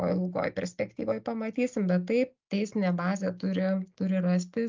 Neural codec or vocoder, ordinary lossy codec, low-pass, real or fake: none; Opus, 24 kbps; 7.2 kHz; real